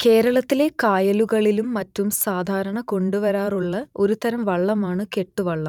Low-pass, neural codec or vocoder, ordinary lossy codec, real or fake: 19.8 kHz; vocoder, 44.1 kHz, 128 mel bands every 512 samples, BigVGAN v2; none; fake